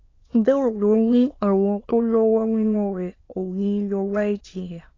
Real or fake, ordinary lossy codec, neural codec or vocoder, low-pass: fake; AAC, 32 kbps; autoencoder, 22.05 kHz, a latent of 192 numbers a frame, VITS, trained on many speakers; 7.2 kHz